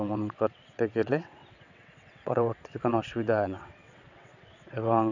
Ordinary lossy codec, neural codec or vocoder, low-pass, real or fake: none; vocoder, 22.05 kHz, 80 mel bands, WaveNeXt; 7.2 kHz; fake